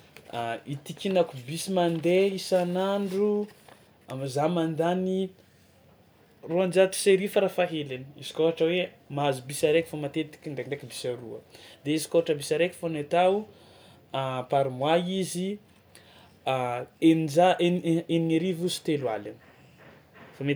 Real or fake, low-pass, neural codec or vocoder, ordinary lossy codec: real; none; none; none